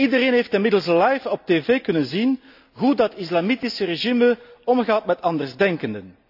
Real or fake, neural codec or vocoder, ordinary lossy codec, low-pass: real; none; AAC, 48 kbps; 5.4 kHz